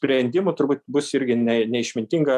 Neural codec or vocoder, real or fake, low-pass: none; real; 14.4 kHz